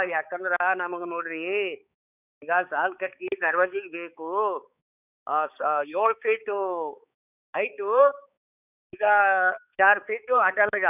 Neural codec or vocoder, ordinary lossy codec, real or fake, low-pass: codec, 16 kHz, 4 kbps, X-Codec, HuBERT features, trained on balanced general audio; none; fake; 3.6 kHz